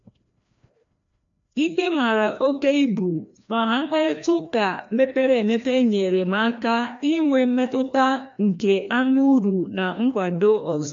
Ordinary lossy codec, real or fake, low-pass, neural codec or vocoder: AAC, 64 kbps; fake; 7.2 kHz; codec, 16 kHz, 1 kbps, FreqCodec, larger model